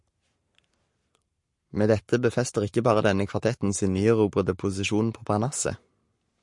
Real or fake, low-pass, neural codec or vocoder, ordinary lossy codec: fake; 10.8 kHz; codec, 44.1 kHz, 7.8 kbps, Pupu-Codec; MP3, 48 kbps